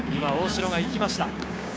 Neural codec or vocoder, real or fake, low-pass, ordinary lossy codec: codec, 16 kHz, 6 kbps, DAC; fake; none; none